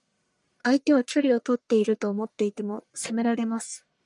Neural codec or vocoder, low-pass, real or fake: codec, 44.1 kHz, 1.7 kbps, Pupu-Codec; 10.8 kHz; fake